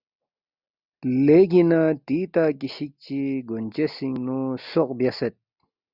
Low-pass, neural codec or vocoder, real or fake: 5.4 kHz; none; real